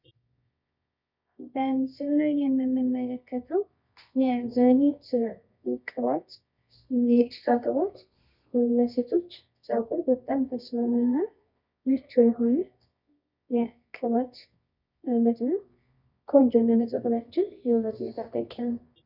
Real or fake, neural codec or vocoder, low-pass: fake; codec, 24 kHz, 0.9 kbps, WavTokenizer, medium music audio release; 5.4 kHz